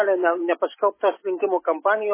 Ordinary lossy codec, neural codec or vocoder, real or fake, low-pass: MP3, 16 kbps; none; real; 3.6 kHz